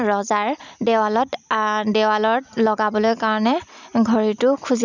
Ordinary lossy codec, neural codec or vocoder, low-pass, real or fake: none; none; 7.2 kHz; real